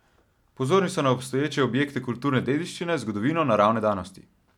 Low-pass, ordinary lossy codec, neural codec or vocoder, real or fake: 19.8 kHz; none; none; real